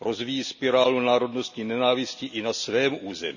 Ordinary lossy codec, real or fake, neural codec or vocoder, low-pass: none; real; none; 7.2 kHz